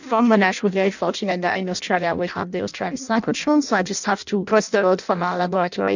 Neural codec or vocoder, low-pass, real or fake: codec, 16 kHz in and 24 kHz out, 0.6 kbps, FireRedTTS-2 codec; 7.2 kHz; fake